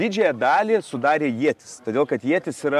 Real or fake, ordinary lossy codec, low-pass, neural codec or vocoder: real; MP3, 96 kbps; 14.4 kHz; none